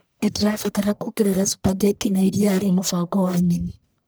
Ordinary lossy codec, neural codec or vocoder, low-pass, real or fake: none; codec, 44.1 kHz, 1.7 kbps, Pupu-Codec; none; fake